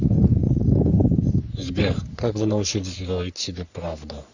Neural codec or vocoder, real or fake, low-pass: codec, 44.1 kHz, 3.4 kbps, Pupu-Codec; fake; 7.2 kHz